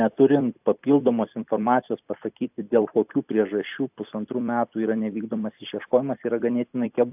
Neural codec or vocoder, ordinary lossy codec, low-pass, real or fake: vocoder, 44.1 kHz, 128 mel bands every 256 samples, BigVGAN v2; AAC, 32 kbps; 3.6 kHz; fake